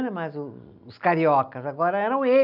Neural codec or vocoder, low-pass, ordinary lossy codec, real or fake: none; 5.4 kHz; none; real